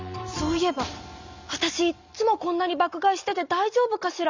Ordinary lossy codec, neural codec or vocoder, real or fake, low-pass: Opus, 64 kbps; none; real; 7.2 kHz